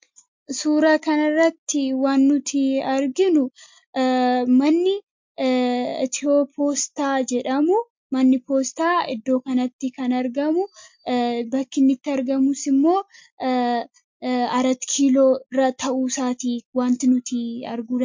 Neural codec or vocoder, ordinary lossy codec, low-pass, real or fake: none; MP3, 48 kbps; 7.2 kHz; real